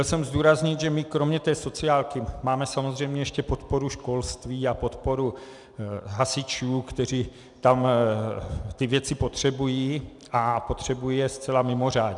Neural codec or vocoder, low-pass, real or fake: none; 10.8 kHz; real